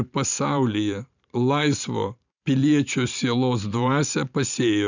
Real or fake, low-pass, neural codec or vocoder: fake; 7.2 kHz; vocoder, 44.1 kHz, 128 mel bands every 512 samples, BigVGAN v2